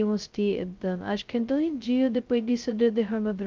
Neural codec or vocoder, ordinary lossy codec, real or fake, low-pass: codec, 16 kHz, 0.2 kbps, FocalCodec; Opus, 24 kbps; fake; 7.2 kHz